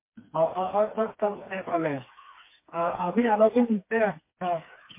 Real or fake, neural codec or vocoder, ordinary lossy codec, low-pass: fake; codec, 16 kHz, 4 kbps, FreqCodec, smaller model; MP3, 24 kbps; 3.6 kHz